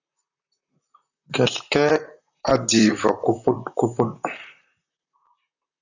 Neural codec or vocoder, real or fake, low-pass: vocoder, 44.1 kHz, 128 mel bands, Pupu-Vocoder; fake; 7.2 kHz